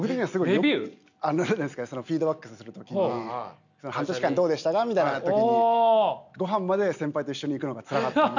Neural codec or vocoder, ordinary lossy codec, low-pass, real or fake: none; none; 7.2 kHz; real